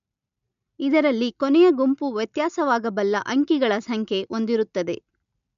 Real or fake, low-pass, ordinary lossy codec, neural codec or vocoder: real; 7.2 kHz; MP3, 96 kbps; none